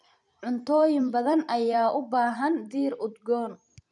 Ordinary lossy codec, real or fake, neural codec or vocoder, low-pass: none; fake; vocoder, 44.1 kHz, 128 mel bands every 256 samples, BigVGAN v2; 10.8 kHz